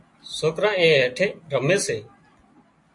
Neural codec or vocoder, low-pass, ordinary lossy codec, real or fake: none; 10.8 kHz; MP3, 48 kbps; real